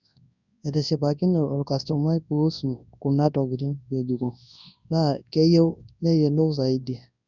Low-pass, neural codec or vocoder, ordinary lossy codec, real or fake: 7.2 kHz; codec, 24 kHz, 0.9 kbps, WavTokenizer, large speech release; none; fake